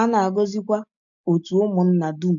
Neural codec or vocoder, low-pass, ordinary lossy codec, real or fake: none; 7.2 kHz; none; real